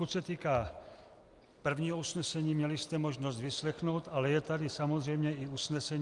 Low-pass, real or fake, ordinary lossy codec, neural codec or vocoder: 10.8 kHz; real; Opus, 32 kbps; none